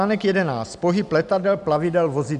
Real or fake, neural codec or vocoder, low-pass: real; none; 10.8 kHz